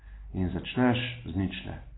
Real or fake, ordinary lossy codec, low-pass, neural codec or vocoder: real; AAC, 16 kbps; 7.2 kHz; none